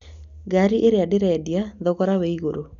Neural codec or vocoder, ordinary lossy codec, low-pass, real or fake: none; none; 7.2 kHz; real